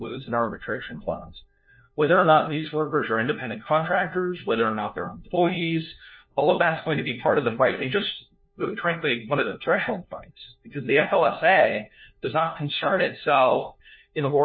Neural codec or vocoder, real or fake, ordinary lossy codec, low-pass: codec, 16 kHz, 1 kbps, FunCodec, trained on LibriTTS, 50 frames a second; fake; MP3, 24 kbps; 7.2 kHz